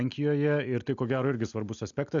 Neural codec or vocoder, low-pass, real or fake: none; 7.2 kHz; real